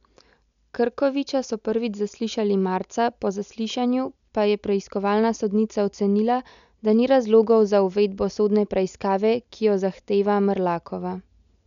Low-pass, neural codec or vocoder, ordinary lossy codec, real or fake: 7.2 kHz; none; none; real